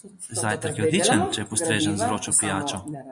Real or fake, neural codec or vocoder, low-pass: real; none; 10.8 kHz